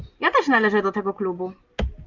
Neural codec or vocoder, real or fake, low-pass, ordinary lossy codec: none; real; 7.2 kHz; Opus, 32 kbps